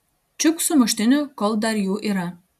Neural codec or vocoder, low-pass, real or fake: none; 14.4 kHz; real